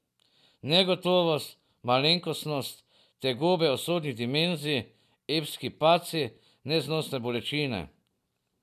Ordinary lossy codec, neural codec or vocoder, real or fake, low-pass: none; none; real; 14.4 kHz